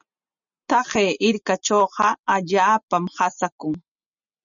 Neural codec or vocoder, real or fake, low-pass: none; real; 7.2 kHz